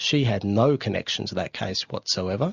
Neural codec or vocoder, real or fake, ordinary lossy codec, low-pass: none; real; Opus, 64 kbps; 7.2 kHz